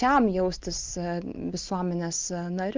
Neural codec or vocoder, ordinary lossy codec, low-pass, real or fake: none; Opus, 24 kbps; 7.2 kHz; real